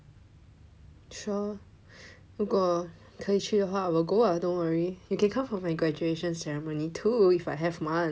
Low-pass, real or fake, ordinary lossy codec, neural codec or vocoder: none; real; none; none